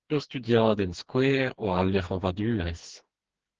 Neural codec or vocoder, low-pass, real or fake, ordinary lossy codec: codec, 16 kHz, 2 kbps, FreqCodec, smaller model; 7.2 kHz; fake; Opus, 16 kbps